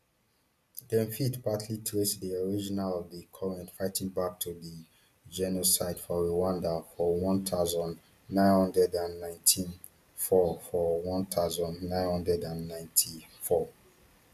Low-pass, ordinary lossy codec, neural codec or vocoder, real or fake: 14.4 kHz; none; none; real